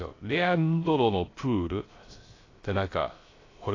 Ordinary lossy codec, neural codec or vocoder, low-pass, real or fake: AAC, 32 kbps; codec, 16 kHz, 0.3 kbps, FocalCodec; 7.2 kHz; fake